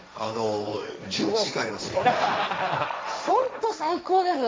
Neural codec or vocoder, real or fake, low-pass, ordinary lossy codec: codec, 16 kHz, 1.1 kbps, Voila-Tokenizer; fake; none; none